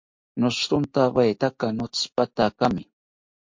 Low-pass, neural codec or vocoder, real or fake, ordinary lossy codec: 7.2 kHz; none; real; MP3, 48 kbps